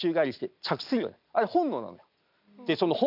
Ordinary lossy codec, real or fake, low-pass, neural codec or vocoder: none; real; 5.4 kHz; none